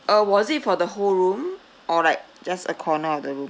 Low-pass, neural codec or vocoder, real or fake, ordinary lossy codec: none; none; real; none